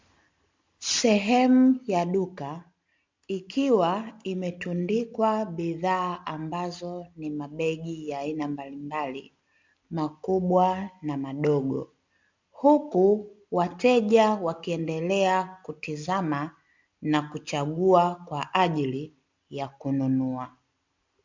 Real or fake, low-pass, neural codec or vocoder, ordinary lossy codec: real; 7.2 kHz; none; MP3, 64 kbps